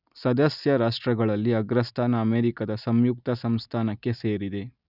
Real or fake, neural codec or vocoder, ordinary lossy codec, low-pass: real; none; none; 5.4 kHz